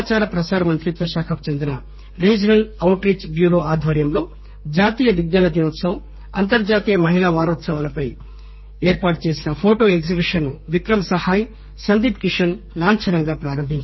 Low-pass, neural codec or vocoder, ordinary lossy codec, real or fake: 7.2 kHz; codec, 32 kHz, 1.9 kbps, SNAC; MP3, 24 kbps; fake